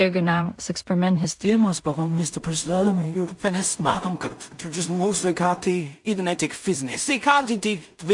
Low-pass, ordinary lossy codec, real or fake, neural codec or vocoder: 10.8 kHz; MP3, 64 kbps; fake; codec, 16 kHz in and 24 kHz out, 0.4 kbps, LongCat-Audio-Codec, two codebook decoder